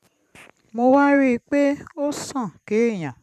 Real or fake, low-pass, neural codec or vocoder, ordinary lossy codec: fake; 14.4 kHz; vocoder, 44.1 kHz, 128 mel bands every 512 samples, BigVGAN v2; none